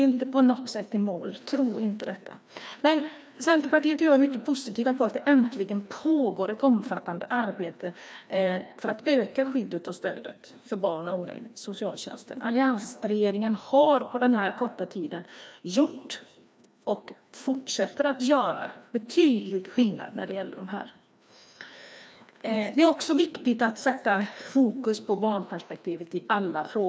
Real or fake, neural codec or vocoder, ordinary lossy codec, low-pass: fake; codec, 16 kHz, 1 kbps, FreqCodec, larger model; none; none